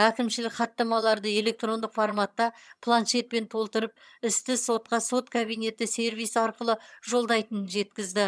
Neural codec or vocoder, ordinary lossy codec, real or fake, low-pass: vocoder, 22.05 kHz, 80 mel bands, HiFi-GAN; none; fake; none